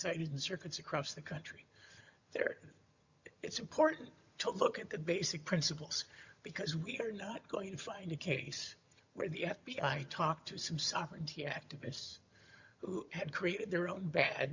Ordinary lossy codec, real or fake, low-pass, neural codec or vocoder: Opus, 64 kbps; fake; 7.2 kHz; vocoder, 22.05 kHz, 80 mel bands, HiFi-GAN